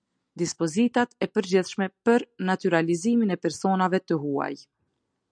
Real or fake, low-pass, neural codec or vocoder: real; 9.9 kHz; none